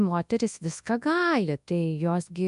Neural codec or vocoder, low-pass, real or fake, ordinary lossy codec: codec, 24 kHz, 0.9 kbps, WavTokenizer, large speech release; 10.8 kHz; fake; AAC, 96 kbps